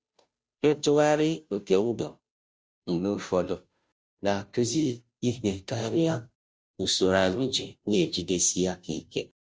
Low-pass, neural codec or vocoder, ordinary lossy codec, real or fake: none; codec, 16 kHz, 0.5 kbps, FunCodec, trained on Chinese and English, 25 frames a second; none; fake